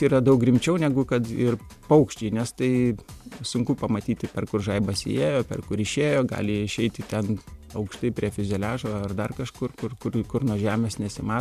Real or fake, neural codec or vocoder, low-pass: fake; vocoder, 44.1 kHz, 128 mel bands every 512 samples, BigVGAN v2; 14.4 kHz